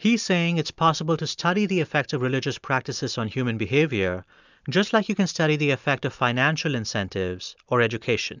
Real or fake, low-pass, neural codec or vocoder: real; 7.2 kHz; none